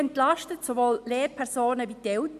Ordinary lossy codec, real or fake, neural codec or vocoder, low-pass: MP3, 96 kbps; real; none; 14.4 kHz